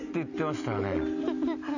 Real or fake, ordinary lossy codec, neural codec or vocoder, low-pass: real; none; none; 7.2 kHz